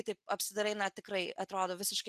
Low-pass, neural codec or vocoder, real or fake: 14.4 kHz; none; real